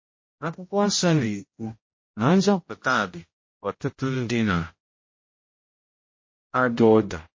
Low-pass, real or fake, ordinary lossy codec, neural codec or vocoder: 7.2 kHz; fake; MP3, 32 kbps; codec, 16 kHz, 0.5 kbps, X-Codec, HuBERT features, trained on general audio